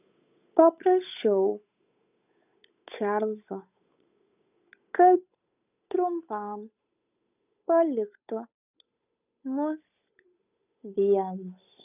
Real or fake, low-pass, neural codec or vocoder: fake; 3.6 kHz; codec, 16 kHz, 8 kbps, FunCodec, trained on Chinese and English, 25 frames a second